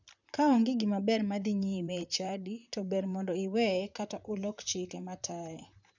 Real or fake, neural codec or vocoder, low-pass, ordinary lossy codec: fake; vocoder, 44.1 kHz, 128 mel bands, Pupu-Vocoder; 7.2 kHz; none